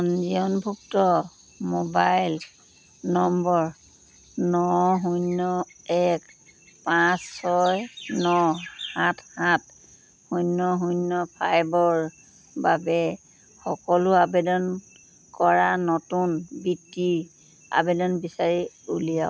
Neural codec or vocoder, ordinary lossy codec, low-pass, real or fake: none; none; none; real